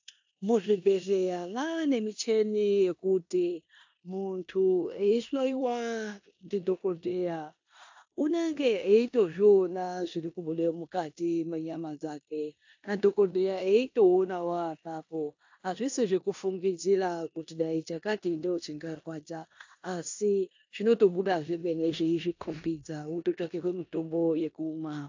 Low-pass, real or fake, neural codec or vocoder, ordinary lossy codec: 7.2 kHz; fake; codec, 16 kHz in and 24 kHz out, 0.9 kbps, LongCat-Audio-Codec, four codebook decoder; AAC, 48 kbps